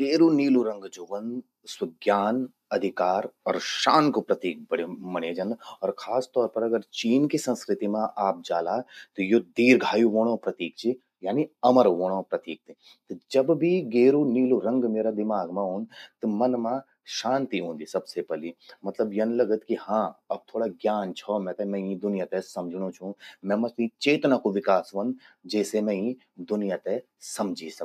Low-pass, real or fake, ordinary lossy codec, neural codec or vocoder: 14.4 kHz; real; none; none